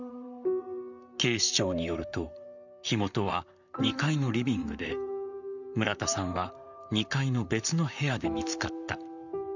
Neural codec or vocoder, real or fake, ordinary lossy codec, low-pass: vocoder, 44.1 kHz, 128 mel bands, Pupu-Vocoder; fake; none; 7.2 kHz